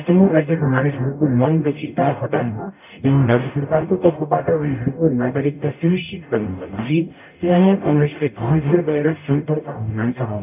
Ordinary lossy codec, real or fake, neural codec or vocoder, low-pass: none; fake; codec, 44.1 kHz, 0.9 kbps, DAC; 3.6 kHz